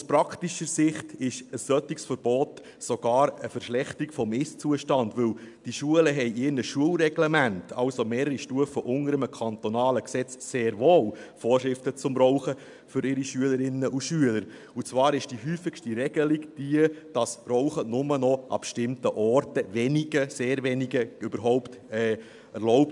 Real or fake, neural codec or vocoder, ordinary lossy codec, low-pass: real; none; MP3, 96 kbps; 10.8 kHz